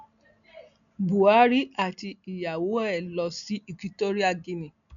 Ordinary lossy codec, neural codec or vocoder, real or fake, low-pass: none; none; real; 7.2 kHz